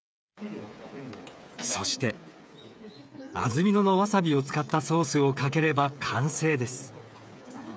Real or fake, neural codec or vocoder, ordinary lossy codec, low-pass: fake; codec, 16 kHz, 8 kbps, FreqCodec, smaller model; none; none